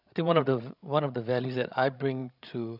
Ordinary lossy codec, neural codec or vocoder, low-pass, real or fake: none; codec, 16 kHz, 16 kbps, FreqCodec, larger model; 5.4 kHz; fake